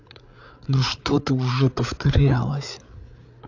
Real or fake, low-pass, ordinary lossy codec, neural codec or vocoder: fake; 7.2 kHz; AAC, 48 kbps; codec, 16 kHz, 8 kbps, FreqCodec, larger model